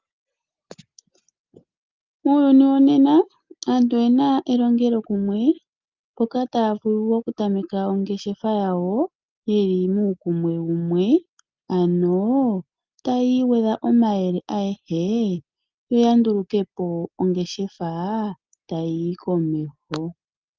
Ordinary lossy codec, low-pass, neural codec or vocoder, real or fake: Opus, 24 kbps; 7.2 kHz; none; real